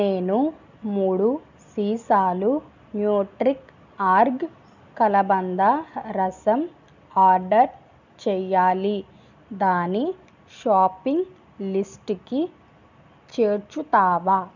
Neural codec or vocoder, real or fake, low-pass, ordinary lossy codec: none; real; 7.2 kHz; none